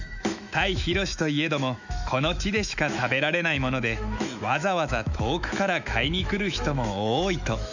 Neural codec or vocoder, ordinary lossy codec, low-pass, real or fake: autoencoder, 48 kHz, 128 numbers a frame, DAC-VAE, trained on Japanese speech; none; 7.2 kHz; fake